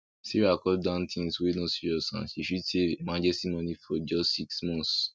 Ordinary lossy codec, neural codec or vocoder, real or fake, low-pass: none; none; real; none